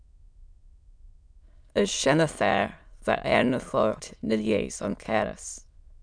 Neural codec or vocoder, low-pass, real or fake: autoencoder, 22.05 kHz, a latent of 192 numbers a frame, VITS, trained on many speakers; 9.9 kHz; fake